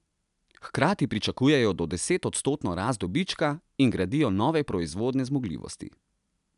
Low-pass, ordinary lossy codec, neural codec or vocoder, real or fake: 10.8 kHz; none; none; real